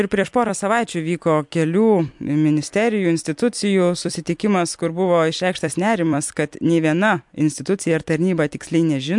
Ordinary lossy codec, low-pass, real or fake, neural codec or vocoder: MP3, 64 kbps; 10.8 kHz; fake; vocoder, 44.1 kHz, 128 mel bands every 256 samples, BigVGAN v2